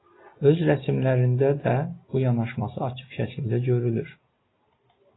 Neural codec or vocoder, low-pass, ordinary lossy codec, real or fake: none; 7.2 kHz; AAC, 16 kbps; real